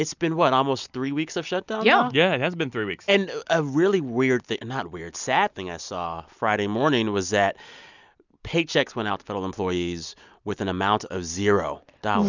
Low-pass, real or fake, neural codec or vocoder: 7.2 kHz; real; none